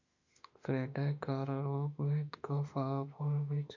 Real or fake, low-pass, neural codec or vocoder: fake; 7.2 kHz; autoencoder, 48 kHz, 32 numbers a frame, DAC-VAE, trained on Japanese speech